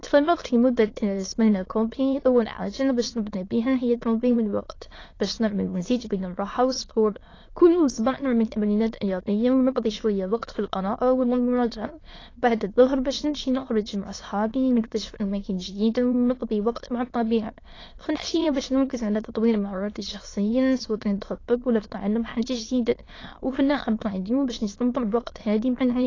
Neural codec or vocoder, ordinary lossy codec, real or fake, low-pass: autoencoder, 22.05 kHz, a latent of 192 numbers a frame, VITS, trained on many speakers; AAC, 32 kbps; fake; 7.2 kHz